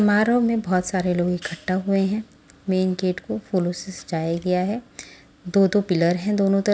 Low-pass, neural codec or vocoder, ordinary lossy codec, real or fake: none; none; none; real